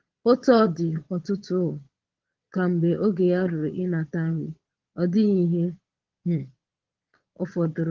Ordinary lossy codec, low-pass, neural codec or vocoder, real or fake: Opus, 16 kbps; 7.2 kHz; vocoder, 22.05 kHz, 80 mel bands, Vocos; fake